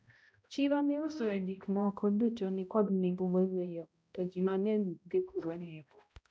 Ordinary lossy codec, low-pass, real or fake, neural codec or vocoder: none; none; fake; codec, 16 kHz, 0.5 kbps, X-Codec, HuBERT features, trained on balanced general audio